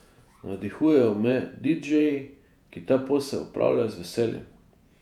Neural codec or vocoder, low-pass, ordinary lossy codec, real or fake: vocoder, 48 kHz, 128 mel bands, Vocos; 19.8 kHz; none; fake